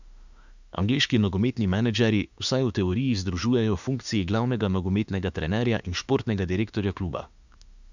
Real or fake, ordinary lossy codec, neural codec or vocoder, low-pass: fake; none; autoencoder, 48 kHz, 32 numbers a frame, DAC-VAE, trained on Japanese speech; 7.2 kHz